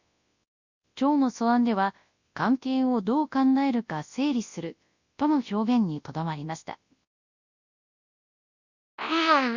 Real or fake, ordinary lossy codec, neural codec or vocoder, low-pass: fake; Opus, 64 kbps; codec, 24 kHz, 0.9 kbps, WavTokenizer, large speech release; 7.2 kHz